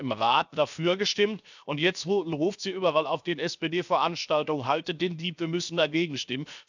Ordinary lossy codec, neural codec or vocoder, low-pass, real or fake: none; codec, 16 kHz, 0.7 kbps, FocalCodec; 7.2 kHz; fake